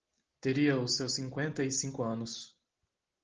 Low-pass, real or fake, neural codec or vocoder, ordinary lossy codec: 7.2 kHz; real; none; Opus, 16 kbps